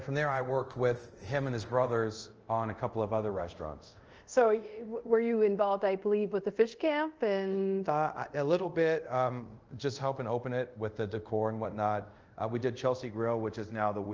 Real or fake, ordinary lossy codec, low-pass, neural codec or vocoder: fake; Opus, 24 kbps; 7.2 kHz; codec, 24 kHz, 0.5 kbps, DualCodec